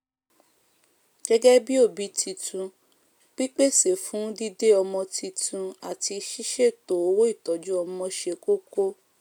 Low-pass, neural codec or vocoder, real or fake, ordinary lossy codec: none; none; real; none